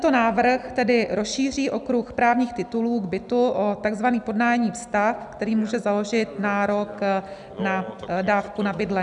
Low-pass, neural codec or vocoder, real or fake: 10.8 kHz; none; real